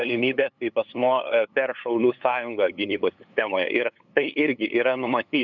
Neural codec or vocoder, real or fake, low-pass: codec, 16 kHz, 8 kbps, FunCodec, trained on LibriTTS, 25 frames a second; fake; 7.2 kHz